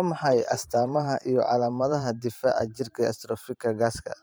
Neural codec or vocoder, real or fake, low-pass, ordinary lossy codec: vocoder, 44.1 kHz, 128 mel bands every 512 samples, BigVGAN v2; fake; none; none